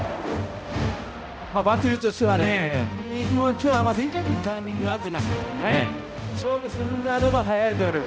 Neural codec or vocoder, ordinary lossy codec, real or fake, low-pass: codec, 16 kHz, 0.5 kbps, X-Codec, HuBERT features, trained on balanced general audio; none; fake; none